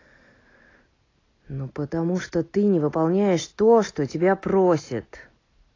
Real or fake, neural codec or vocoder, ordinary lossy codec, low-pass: real; none; AAC, 32 kbps; 7.2 kHz